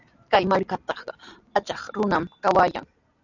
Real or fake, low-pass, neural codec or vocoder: real; 7.2 kHz; none